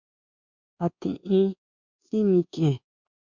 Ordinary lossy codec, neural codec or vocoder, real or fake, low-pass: Opus, 64 kbps; codec, 24 kHz, 1.2 kbps, DualCodec; fake; 7.2 kHz